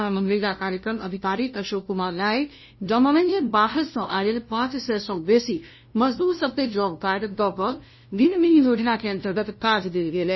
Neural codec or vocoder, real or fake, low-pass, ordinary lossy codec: codec, 16 kHz, 0.5 kbps, FunCodec, trained on LibriTTS, 25 frames a second; fake; 7.2 kHz; MP3, 24 kbps